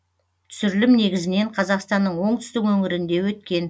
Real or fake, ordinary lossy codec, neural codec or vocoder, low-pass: real; none; none; none